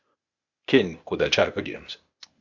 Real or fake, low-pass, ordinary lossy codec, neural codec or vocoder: fake; 7.2 kHz; Opus, 64 kbps; codec, 16 kHz, 0.8 kbps, ZipCodec